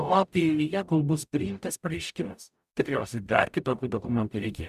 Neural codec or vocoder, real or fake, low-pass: codec, 44.1 kHz, 0.9 kbps, DAC; fake; 14.4 kHz